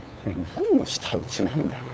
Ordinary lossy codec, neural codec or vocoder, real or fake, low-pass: none; codec, 16 kHz, 8 kbps, FunCodec, trained on LibriTTS, 25 frames a second; fake; none